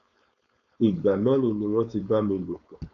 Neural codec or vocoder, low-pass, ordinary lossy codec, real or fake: codec, 16 kHz, 4.8 kbps, FACodec; 7.2 kHz; none; fake